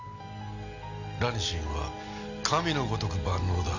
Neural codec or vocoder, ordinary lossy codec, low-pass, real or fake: none; none; 7.2 kHz; real